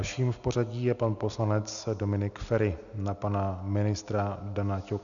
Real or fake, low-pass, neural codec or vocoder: real; 7.2 kHz; none